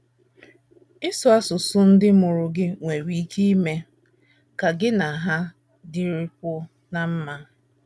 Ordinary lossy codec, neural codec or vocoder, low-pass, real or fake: none; none; none; real